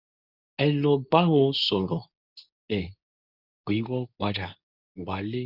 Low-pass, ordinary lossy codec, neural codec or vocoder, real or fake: 5.4 kHz; none; codec, 24 kHz, 0.9 kbps, WavTokenizer, medium speech release version 2; fake